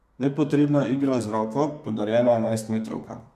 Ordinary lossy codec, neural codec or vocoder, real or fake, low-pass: MP3, 96 kbps; codec, 32 kHz, 1.9 kbps, SNAC; fake; 14.4 kHz